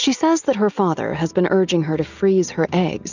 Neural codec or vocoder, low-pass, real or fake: none; 7.2 kHz; real